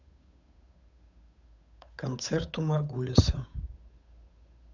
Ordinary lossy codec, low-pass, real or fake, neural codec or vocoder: none; 7.2 kHz; fake; codec, 16 kHz, 8 kbps, FunCodec, trained on Chinese and English, 25 frames a second